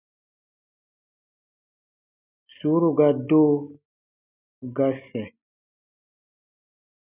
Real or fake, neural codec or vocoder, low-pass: real; none; 3.6 kHz